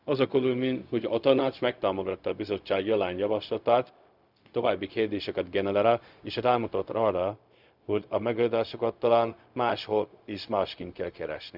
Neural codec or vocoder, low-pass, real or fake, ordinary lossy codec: codec, 16 kHz, 0.4 kbps, LongCat-Audio-Codec; 5.4 kHz; fake; none